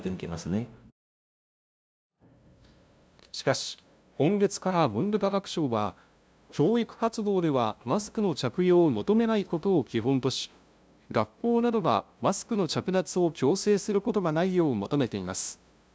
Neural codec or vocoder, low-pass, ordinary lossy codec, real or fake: codec, 16 kHz, 0.5 kbps, FunCodec, trained on LibriTTS, 25 frames a second; none; none; fake